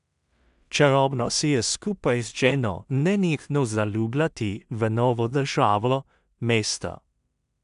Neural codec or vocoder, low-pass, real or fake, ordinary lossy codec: codec, 16 kHz in and 24 kHz out, 0.4 kbps, LongCat-Audio-Codec, two codebook decoder; 10.8 kHz; fake; none